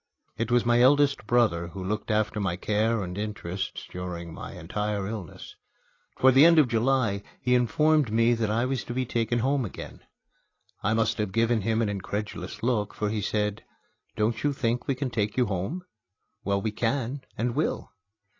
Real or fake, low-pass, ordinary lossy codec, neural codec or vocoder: real; 7.2 kHz; AAC, 32 kbps; none